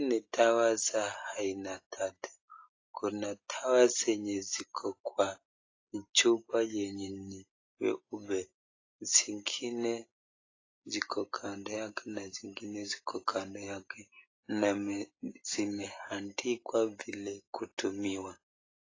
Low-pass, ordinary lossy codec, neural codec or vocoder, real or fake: 7.2 kHz; AAC, 32 kbps; none; real